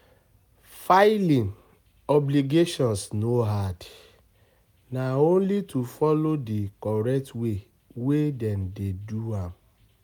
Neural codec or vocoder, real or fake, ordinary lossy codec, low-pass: none; real; none; none